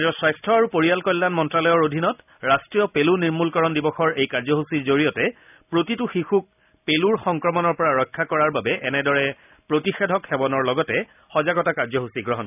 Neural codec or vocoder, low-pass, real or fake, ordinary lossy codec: none; 3.6 kHz; real; none